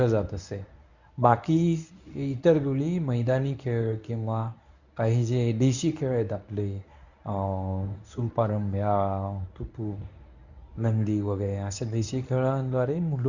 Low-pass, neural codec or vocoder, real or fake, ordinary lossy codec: 7.2 kHz; codec, 24 kHz, 0.9 kbps, WavTokenizer, medium speech release version 1; fake; none